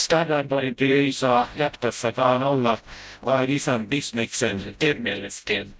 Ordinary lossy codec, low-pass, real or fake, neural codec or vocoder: none; none; fake; codec, 16 kHz, 0.5 kbps, FreqCodec, smaller model